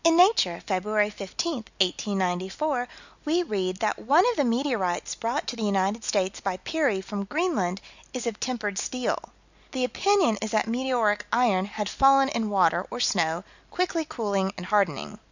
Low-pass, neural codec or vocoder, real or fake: 7.2 kHz; none; real